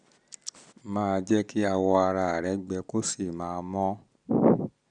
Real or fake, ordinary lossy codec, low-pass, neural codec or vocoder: real; none; 9.9 kHz; none